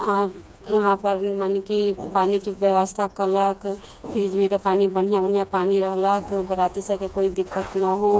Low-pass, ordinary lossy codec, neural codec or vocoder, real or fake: none; none; codec, 16 kHz, 2 kbps, FreqCodec, smaller model; fake